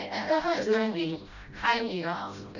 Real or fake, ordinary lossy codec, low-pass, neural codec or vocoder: fake; none; 7.2 kHz; codec, 16 kHz, 0.5 kbps, FreqCodec, smaller model